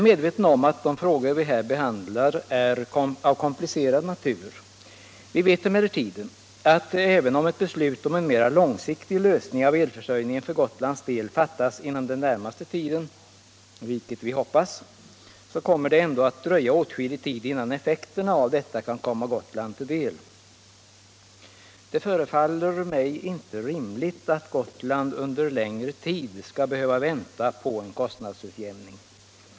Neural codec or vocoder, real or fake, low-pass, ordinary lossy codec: none; real; none; none